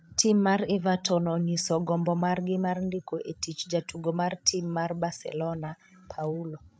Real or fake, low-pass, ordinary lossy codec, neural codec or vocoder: fake; none; none; codec, 16 kHz, 16 kbps, FreqCodec, larger model